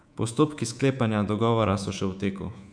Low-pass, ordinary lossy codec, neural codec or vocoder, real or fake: 9.9 kHz; none; codec, 24 kHz, 3.1 kbps, DualCodec; fake